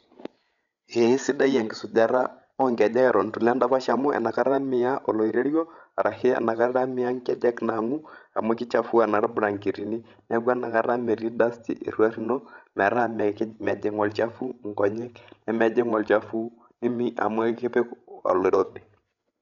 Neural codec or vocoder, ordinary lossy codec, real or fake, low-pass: codec, 16 kHz, 16 kbps, FreqCodec, larger model; none; fake; 7.2 kHz